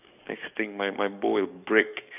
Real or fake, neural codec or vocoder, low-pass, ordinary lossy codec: fake; codec, 44.1 kHz, 7.8 kbps, DAC; 3.6 kHz; none